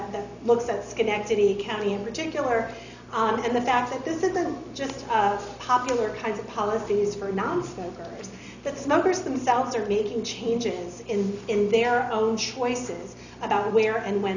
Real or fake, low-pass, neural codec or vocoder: real; 7.2 kHz; none